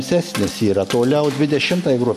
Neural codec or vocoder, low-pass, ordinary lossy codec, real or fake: none; 14.4 kHz; MP3, 64 kbps; real